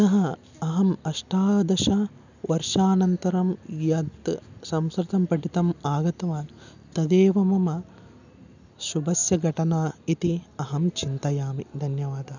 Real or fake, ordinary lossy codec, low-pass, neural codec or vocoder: real; none; 7.2 kHz; none